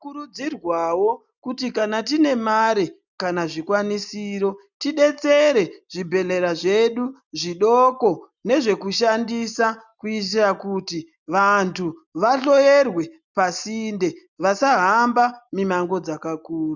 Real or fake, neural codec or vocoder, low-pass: real; none; 7.2 kHz